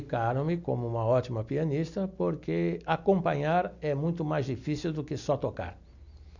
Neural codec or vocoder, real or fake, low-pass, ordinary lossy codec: none; real; 7.2 kHz; none